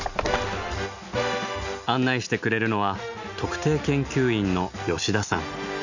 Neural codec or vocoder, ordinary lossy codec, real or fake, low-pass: none; none; real; 7.2 kHz